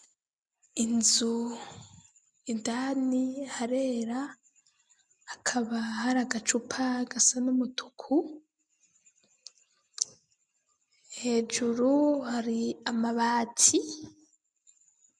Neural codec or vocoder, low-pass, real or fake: none; 9.9 kHz; real